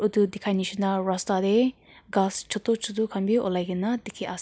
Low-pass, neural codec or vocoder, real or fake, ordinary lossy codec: none; none; real; none